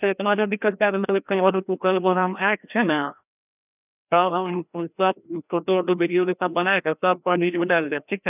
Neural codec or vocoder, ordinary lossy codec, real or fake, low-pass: codec, 16 kHz, 1 kbps, FreqCodec, larger model; none; fake; 3.6 kHz